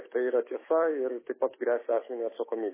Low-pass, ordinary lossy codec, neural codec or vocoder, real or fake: 3.6 kHz; MP3, 16 kbps; autoencoder, 48 kHz, 128 numbers a frame, DAC-VAE, trained on Japanese speech; fake